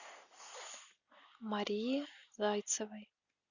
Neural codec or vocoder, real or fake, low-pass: none; real; 7.2 kHz